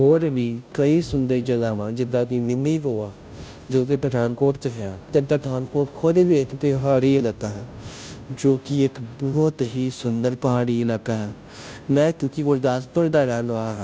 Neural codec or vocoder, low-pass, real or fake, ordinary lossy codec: codec, 16 kHz, 0.5 kbps, FunCodec, trained on Chinese and English, 25 frames a second; none; fake; none